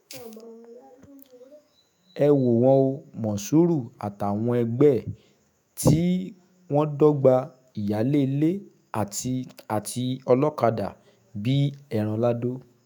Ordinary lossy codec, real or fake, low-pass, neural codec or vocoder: none; fake; none; autoencoder, 48 kHz, 128 numbers a frame, DAC-VAE, trained on Japanese speech